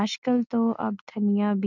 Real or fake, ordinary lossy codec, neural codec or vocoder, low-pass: real; none; none; 7.2 kHz